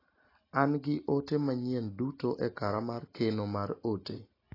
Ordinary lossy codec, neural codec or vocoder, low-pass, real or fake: AAC, 24 kbps; none; 5.4 kHz; real